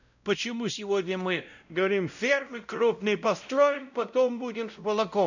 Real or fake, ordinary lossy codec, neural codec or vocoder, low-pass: fake; none; codec, 16 kHz, 0.5 kbps, X-Codec, WavLM features, trained on Multilingual LibriSpeech; 7.2 kHz